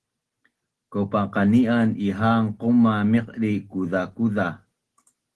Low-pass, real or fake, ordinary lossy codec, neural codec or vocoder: 10.8 kHz; real; Opus, 16 kbps; none